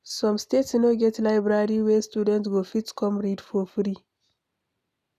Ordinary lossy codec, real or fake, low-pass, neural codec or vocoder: none; real; 14.4 kHz; none